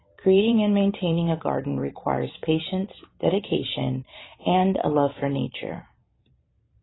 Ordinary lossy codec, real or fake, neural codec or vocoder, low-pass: AAC, 16 kbps; fake; vocoder, 44.1 kHz, 128 mel bands every 512 samples, BigVGAN v2; 7.2 kHz